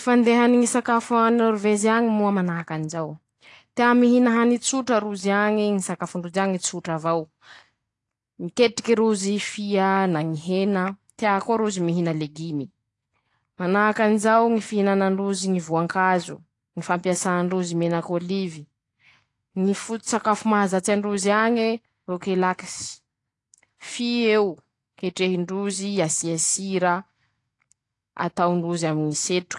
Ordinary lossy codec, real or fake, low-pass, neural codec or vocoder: AAC, 48 kbps; real; 10.8 kHz; none